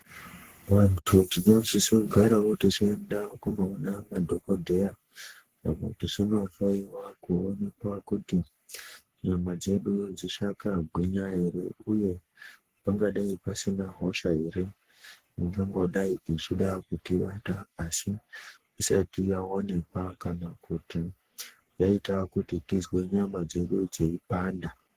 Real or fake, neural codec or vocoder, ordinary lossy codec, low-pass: fake; codec, 44.1 kHz, 3.4 kbps, Pupu-Codec; Opus, 16 kbps; 14.4 kHz